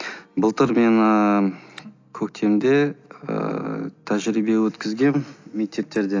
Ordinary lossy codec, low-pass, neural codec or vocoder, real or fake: AAC, 48 kbps; 7.2 kHz; none; real